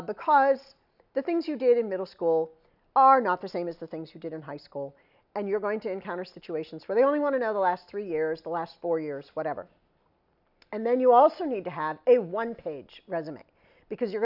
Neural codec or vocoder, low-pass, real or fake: none; 5.4 kHz; real